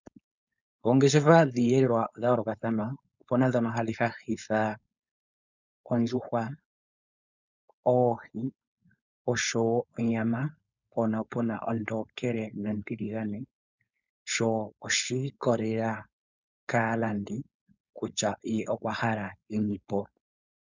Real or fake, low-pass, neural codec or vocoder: fake; 7.2 kHz; codec, 16 kHz, 4.8 kbps, FACodec